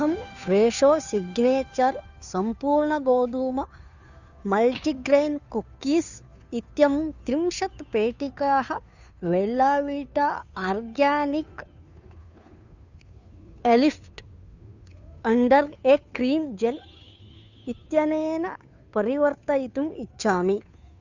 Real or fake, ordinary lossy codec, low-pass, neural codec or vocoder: fake; none; 7.2 kHz; codec, 16 kHz, 2 kbps, FunCodec, trained on Chinese and English, 25 frames a second